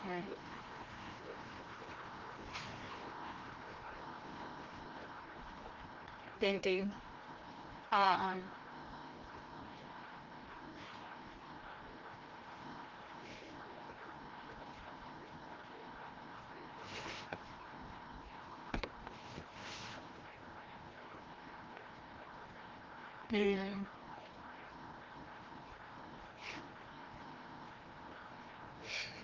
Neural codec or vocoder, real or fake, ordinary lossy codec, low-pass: codec, 16 kHz, 1 kbps, FreqCodec, larger model; fake; Opus, 16 kbps; 7.2 kHz